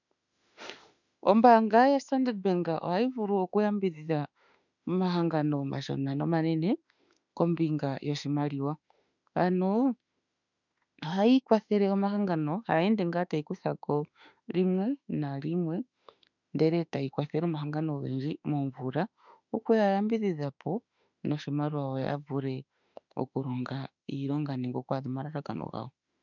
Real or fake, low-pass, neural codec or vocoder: fake; 7.2 kHz; autoencoder, 48 kHz, 32 numbers a frame, DAC-VAE, trained on Japanese speech